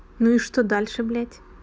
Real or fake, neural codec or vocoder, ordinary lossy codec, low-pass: real; none; none; none